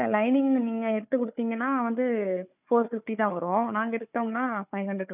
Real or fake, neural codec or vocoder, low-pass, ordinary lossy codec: fake; codec, 16 kHz, 4 kbps, FunCodec, trained on Chinese and English, 50 frames a second; 3.6 kHz; none